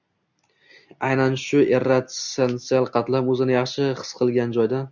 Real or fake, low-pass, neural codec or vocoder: real; 7.2 kHz; none